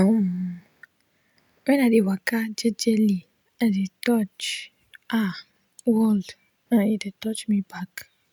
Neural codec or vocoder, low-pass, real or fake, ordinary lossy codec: none; 19.8 kHz; real; none